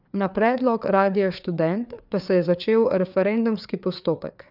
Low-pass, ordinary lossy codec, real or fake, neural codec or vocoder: 5.4 kHz; none; fake; codec, 16 kHz, 4 kbps, FreqCodec, larger model